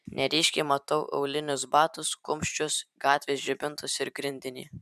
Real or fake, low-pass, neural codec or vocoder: fake; 14.4 kHz; vocoder, 44.1 kHz, 128 mel bands every 512 samples, BigVGAN v2